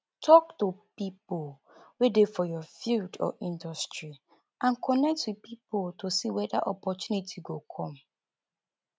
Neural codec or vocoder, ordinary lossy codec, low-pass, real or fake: none; none; none; real